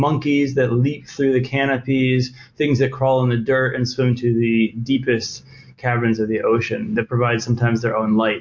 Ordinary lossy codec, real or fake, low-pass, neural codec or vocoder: MP3, 48 kbps; real; 7.2 kHz; none